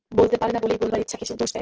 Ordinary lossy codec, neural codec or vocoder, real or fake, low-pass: Opus, 16 kbps; none; real; 7.2 kHz